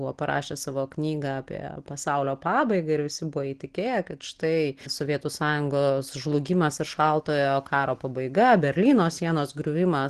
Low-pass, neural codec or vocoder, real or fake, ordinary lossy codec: 10.8 kHz; none; real; Opus, 16 kbps